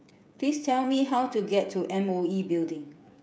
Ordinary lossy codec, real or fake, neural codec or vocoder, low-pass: none; fake; codec, 16 kHz, 16 kbps, FreqCodec, smaller model; none